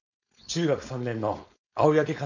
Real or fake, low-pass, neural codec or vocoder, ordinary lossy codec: fake; 7.2 kHz; codec, 16 kHz, 4.8 kbps, FACodec; none